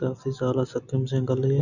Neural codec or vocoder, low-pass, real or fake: none; 7.2 kHz; real